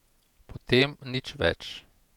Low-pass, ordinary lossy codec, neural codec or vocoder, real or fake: 19.8 kHz; none; vocoder, 48 kHz, 128 mel bands, Vocos; fake